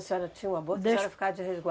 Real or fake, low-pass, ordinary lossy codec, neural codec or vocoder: real; none; none; none